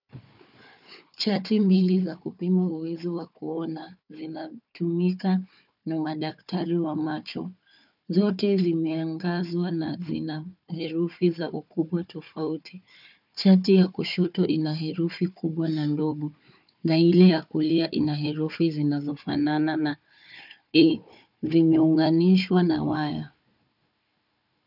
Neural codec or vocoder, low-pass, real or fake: codec, 16 kHz, 4 kbps, FunCodec, trained on Chinese and English, 50 frames a second; 5.4 kHz; fake